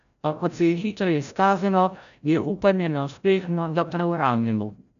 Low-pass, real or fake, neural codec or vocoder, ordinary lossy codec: 7.2 kHz; fake; codec, 16 kHz, 0.5 kbps, FreqCodec, larger model; none